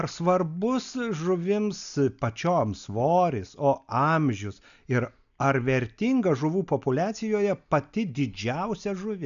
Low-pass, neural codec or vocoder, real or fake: 7.2 kHz; none; real